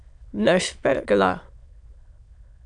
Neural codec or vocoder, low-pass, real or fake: autoencoder, 22.05 kHz, a latent of 192 numbers a frame, VITS, trained on many speakers; 9.9 kHz; fake